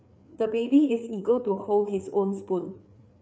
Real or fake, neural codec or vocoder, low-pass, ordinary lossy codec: fake; codec, 16 kHz, 4 kbps, FreqCodec, larger model; none; none